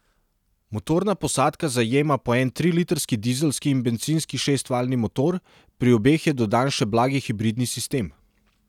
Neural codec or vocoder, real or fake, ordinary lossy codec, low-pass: none; real; none; 19.8 kHz